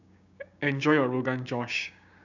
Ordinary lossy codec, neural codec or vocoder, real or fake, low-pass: none; codec, 16 kHz, 6 kbps, DAC; fake; 7.2 kHz